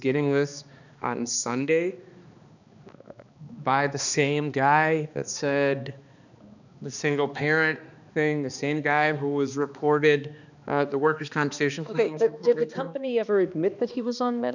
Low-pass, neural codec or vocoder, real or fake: 7.2 kHz; codec, 16 kHz, 2 kbps, X-Codec, HuBERT features, trained on balanced general audio; fake